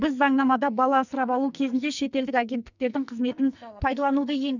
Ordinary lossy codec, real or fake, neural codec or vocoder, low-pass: none; fake; codec, 44.1 kHz, 2.6 kbps, SNAC; 7.2 kHz